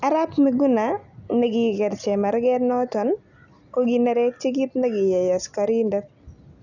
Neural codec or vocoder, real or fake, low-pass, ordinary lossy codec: none; real; 7.2 kHz; none